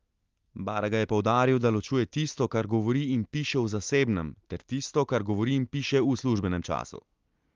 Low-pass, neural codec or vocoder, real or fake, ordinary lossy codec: 7.2 kHz; none; real; Opus, 32 kbps